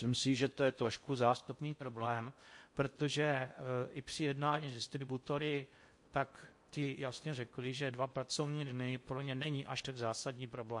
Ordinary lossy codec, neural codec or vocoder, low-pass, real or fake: MP3, 48 kbps; codec, 16 kHz in and 24 kHz out, 0.6 kbps, FocalCodec, streaming, 4096 codes; 10.8 kHz; fake